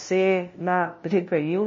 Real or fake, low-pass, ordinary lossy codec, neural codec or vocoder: fake; 7.2 kHz; MP3, 32 kbps; codec, 16 kHz, 0.5 kbps, FunCodec, trained on LibriTTS, 25 frames a second